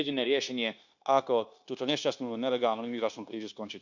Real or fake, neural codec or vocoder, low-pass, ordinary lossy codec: fake; codec, 16 kHz, 0.9 kbps, LongCat-Audio-Codec; 7.2 kHz; none